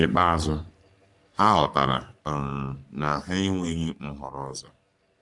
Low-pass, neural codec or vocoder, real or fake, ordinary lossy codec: 10.8 kHz; codec, 44.1 kHz, 3.4 kbps, Pupu-Codec; fake; none